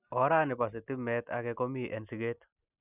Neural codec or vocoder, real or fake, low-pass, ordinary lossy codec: none; real; 3.6 kHz; none